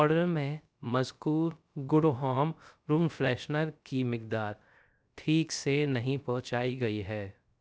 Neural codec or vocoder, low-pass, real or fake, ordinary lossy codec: codec, 16 kHz, 0.3 kbps, FocalCodec; none; fake; none